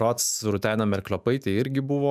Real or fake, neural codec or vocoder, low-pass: fake; autoencoder, 48 kHz, 128 numbers a frame, DAC-VAE, trained on Japanese speech; 14.4 kHz